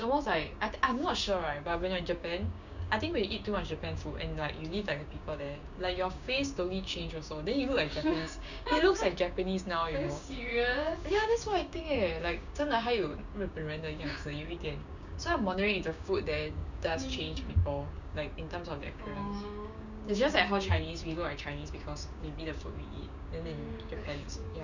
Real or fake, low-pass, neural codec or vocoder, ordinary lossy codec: fake; 7.2 kHz; codec, 16 kHz, 6 kbps, DAC; none